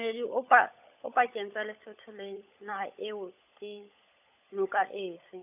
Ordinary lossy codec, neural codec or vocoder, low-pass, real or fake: AAC, 32 kbps; codec, 16 kHz, 16 kbps, FunCodec, trained on LibriTTS, 50 frames a second; 3.6 kHz; fake